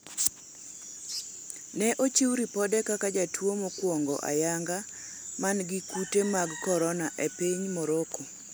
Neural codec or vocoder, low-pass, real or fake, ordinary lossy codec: none; none; real; none